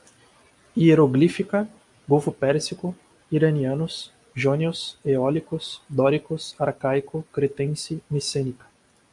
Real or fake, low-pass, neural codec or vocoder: real; 10.8 kHz; none